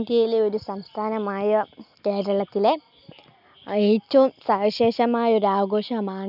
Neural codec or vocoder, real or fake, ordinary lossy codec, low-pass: none; real; none; 5.4 kHz